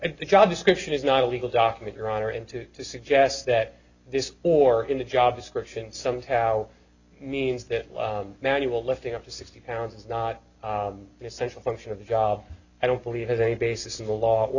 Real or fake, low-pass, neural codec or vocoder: real; 7.2 kHz; none